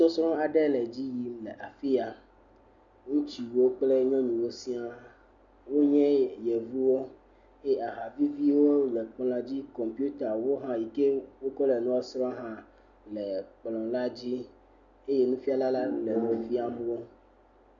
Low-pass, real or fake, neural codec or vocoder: 7.2 kHz; real; none